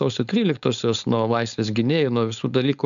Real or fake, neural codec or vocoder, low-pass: fake; codec, 16 kHz, 4.8 kbps, FACodec; 7.2 kHz